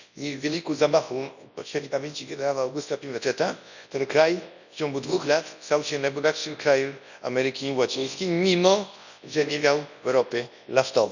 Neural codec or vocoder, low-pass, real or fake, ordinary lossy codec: codec, 24 kHz, 0.9 kbps, WavTokenizer, large speech release; 7.2 kHz; fake; none